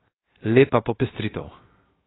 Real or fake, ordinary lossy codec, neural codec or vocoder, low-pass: fake; AAC, 16 kbps; codec, 16 kHz, 0.7 kbps, FocalCodec; 7.2 kHz